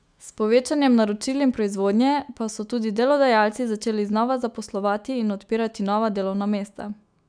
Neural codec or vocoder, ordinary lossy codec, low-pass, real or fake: autoencoder, 48 kHz, 128 numbers a frame, DAC-VAE, trained on Japanese speech; none; 9.9 kHz; fake